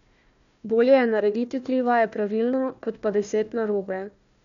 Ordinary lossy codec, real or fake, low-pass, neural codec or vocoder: MP3, 96 kbps; fake; 7.2 kHz; codec, 16 kHz, 1 kbps, FunCodec, trained on Chinese and English, 50 frames a second